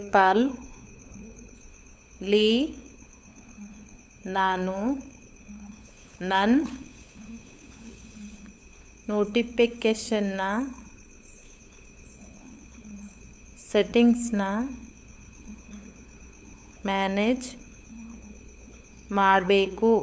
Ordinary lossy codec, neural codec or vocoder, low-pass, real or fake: none; codec, 16 kHz, 8 kbps, FunCodec, trained on LibriTTS, 25 frames a second; none; fake